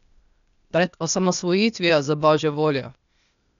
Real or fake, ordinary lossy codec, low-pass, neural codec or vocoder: fake; none; 7.2 kHz; codec, 16 kHz, 0.8 kbps, ZipCodec